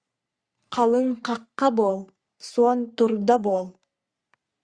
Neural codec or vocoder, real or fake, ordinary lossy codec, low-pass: codec, 44.1 kHz, 3.4 kbps, Pupu-Codec; fake; Opus, 64 kbps; 9.9 kHz